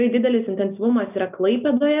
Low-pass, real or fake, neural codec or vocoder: 3.6 kHz; real; none